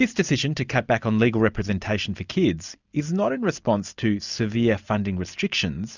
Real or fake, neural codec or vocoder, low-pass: real; none; 7.2 kHz